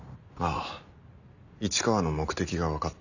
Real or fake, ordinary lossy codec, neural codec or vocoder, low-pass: real; none; none; 7.2 kHz